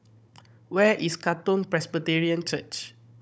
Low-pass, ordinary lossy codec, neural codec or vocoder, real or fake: none; none; codec, 16 kHz, 16 kbps, FunCodec, trained on Chinese and English, 50 frames a second; fake